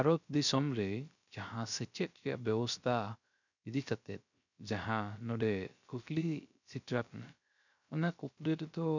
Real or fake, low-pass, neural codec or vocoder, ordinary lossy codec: fake; 7.2 kHz; codec, 16 kHz, 0.3 kbps, FocalCodec; none